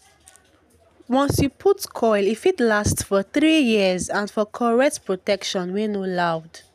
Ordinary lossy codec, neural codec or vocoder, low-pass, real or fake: none; none; 14.4 kHz; real